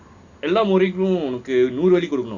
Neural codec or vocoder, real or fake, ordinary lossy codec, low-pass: none; real; Opus, 64 kbps; 7.2 kHz